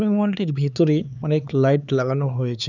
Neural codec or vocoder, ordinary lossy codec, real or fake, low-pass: codec, 16 kHz, 4 kbps, X-Codec, HuBERT features, trained on LibriSpeech; none; fake; 7.2 kHz